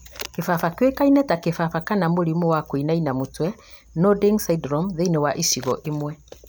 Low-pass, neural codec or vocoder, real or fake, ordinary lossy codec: none; none; real; none